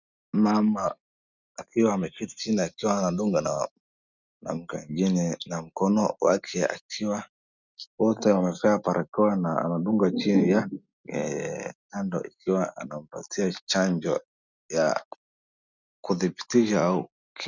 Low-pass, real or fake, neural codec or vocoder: 7.2 kHz; real; none